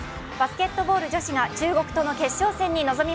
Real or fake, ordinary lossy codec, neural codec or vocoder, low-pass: real; none; none; none